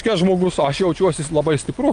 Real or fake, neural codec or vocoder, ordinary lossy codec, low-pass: real; none; Opus, 32 kbps; 9.9 kHz